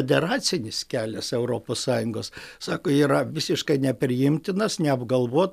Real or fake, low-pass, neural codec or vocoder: real; 14.4 kHz; none